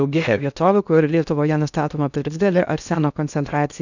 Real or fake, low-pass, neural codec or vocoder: fake; 7.2 kHz; codec, 16 kHz in and 24 kHz out, 0.6 kbps, FocalCodec, streaming, 2048 codes